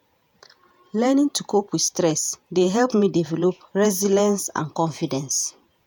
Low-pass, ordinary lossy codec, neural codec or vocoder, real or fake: none; none; vocoder, 48 kHz, 128 mel bands, Vocos; fake